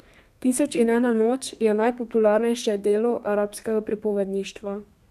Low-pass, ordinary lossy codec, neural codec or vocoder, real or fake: 14.4 kHz; none; codec, 32 kHz, 1.9 kbps, SNAC; fake